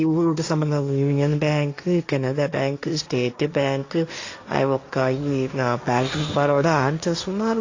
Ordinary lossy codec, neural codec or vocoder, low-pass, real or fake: AAC, 48 kbps; codec, 16 kHz, 1.1 kbps, Voila-Tokenizer; 7.2 kHz; fake